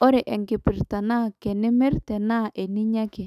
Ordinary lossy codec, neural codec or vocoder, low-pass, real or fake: none; autoencoder, 48 kHz, 128 numbers a frame, DAC-VAE, trained on Japanese speech; 14.4 kHz; fake